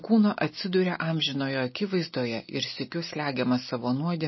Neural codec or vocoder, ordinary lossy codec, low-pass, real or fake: none; MP3, 24 kbps; 7.2 kHz; real